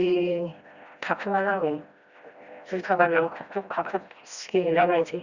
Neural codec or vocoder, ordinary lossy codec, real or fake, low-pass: codec, 16 kHz, 1 kbps, FreqCodec, smaller model; Opus, 64 kbps; fake; 7.2 kHz